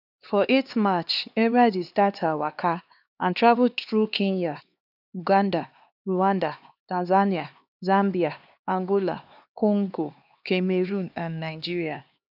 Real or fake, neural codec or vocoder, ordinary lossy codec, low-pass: fake; codec, 16 kHz, 2 kbps, X-Codec, HuBERT features, trained on LibriSpeech; none; 5.4 kHz